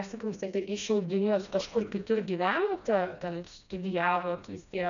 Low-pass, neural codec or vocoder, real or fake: 7.2 kHz; codec, 16 kHz, 1 kbps, FreqCodec, smaller model; fake